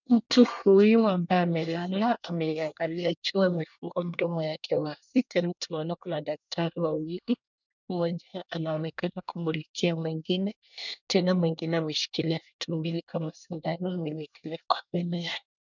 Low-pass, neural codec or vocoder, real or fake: 7.2 kHz; codec, 24 kHz, 1 kbps, SNAC; fake